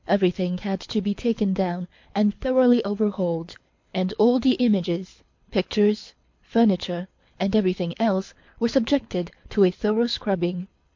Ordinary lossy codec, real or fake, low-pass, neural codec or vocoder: MP3, 48 kbps; fake; 7.2 kHz; codec, 24 kHz, 6 kbps, HILCodec